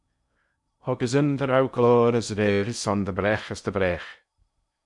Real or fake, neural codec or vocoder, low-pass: fake; codec, 16 kHz in and 24 kHz out, 0.8 kbps, FocalCodec, streaming, 65536 codes; 10.8 kHz